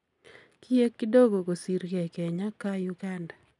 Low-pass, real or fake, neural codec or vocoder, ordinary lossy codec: 10.8 kHz; real; none; none